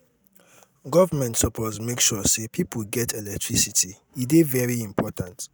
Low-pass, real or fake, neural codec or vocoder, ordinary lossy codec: none; real; none; none